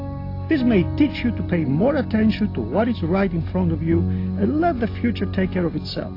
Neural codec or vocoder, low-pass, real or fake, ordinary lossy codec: none; 5.4 kHz; real; AAC, 32 kbps